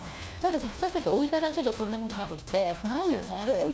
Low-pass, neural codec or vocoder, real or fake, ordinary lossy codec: none; codec, 16 kHz, 1 kbps, FunCodec, trained on LibriTTS, 50 frames a second; fake; none